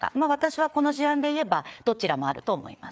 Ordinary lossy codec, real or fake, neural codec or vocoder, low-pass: none; fake; codec, 16 kHz, 4 kbps, FreqCodec, larger model; none